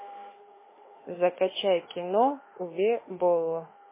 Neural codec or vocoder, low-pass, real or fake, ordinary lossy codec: autoencoder, 48 kHz, 32 numbers a frame, DAC-VAE, trained on Japanese speech; 3.6 kHz; fake; MP3, 16 kbps